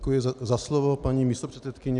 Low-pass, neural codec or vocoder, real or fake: 10.8 kHz; none; real